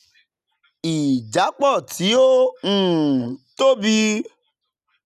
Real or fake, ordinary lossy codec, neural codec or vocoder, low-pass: real; none; none; 14.4 kHz